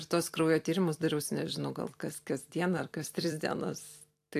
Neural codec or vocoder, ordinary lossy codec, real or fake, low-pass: vocoder, 44.1 kHz, 128 mel bands every 256 samples, BigVGAN v2; AAC, 64 kbps; fake; 14.4 kHz